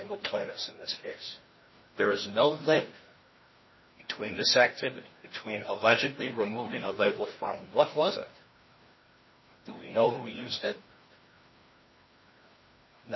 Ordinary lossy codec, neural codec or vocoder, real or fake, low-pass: MP3, 24 kbps; codec, 16 kHz, 1 kbps, FreqCodec, larger model; fake; 7.2 kHz